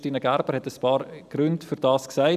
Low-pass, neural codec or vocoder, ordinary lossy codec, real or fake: 14.4 kHz; none; none; real